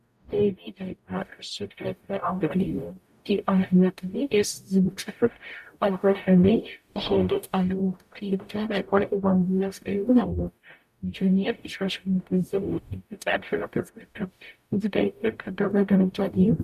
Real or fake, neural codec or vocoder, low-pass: fake; codec, 44.1 kHz, 0.9 kbps, DAC; 14.4 kHz